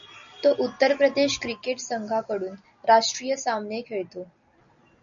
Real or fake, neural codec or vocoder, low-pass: real; none; 7.2 kHz